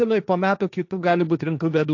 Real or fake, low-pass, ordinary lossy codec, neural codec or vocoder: fake; 7.2 kHz; AAC, 48 kbps; codec, 16 kHz, 1.1 kbps, Voila-Tokenizer